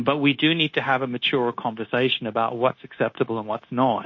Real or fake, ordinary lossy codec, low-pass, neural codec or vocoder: fake; MP3, 32 kbps; 7.2 kHz; codec, 16 kHz, 0.9 kbps, LongCat-Audio-Codec